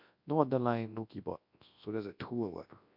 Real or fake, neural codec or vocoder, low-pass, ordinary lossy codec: fake; codec, 24 kHz, 0.9 kbps, WavTokenizer, large speech release; 5.4 kHz; MP3, 48 kbps